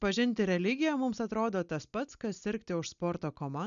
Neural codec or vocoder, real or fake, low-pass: none; real; 7.2 kHz